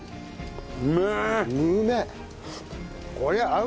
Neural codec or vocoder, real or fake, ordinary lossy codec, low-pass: none; real; none; none